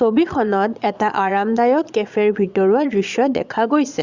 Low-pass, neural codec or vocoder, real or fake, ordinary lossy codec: 7.2 kHz; vocoder, 22.05 kHz, 80 mel bands, Vocos; fake; Opus, 64 kbps